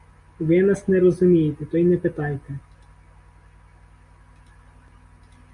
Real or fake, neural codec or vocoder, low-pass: real; none; 10.8 kHz